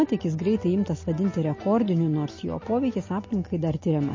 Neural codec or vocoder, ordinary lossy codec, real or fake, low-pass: none; MP3, 32 kbps; real; 7.2 kHz